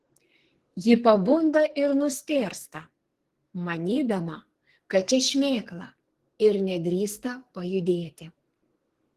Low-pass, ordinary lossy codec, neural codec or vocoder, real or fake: 14.4 kHz; Opus, 16 kbps; codec, 44.1 kHz, 2.6 kbps, SNAC; fake